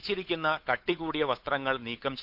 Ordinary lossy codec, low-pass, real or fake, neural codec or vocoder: none; 5.4 kHz; fake; codec, 16 kHz, 8 kbps, FunCodec, trained on Chinese and English, 25 frames a second